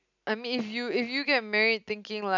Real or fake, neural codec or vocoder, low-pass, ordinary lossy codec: real; none; 7.2 kHz; none